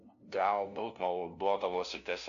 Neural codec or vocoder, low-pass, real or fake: codec, 16 kHz, 0.5 kbps, FunCodec, trained on LibriTTS, 25 frames a second; 7.2 kHz; fake